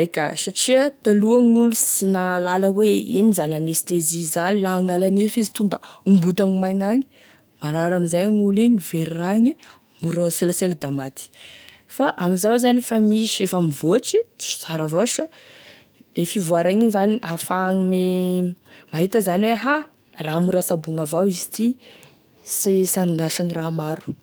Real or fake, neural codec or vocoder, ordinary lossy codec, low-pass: fake; codec, 44.1 kHz, 2.6 kbps, SNAC; none; none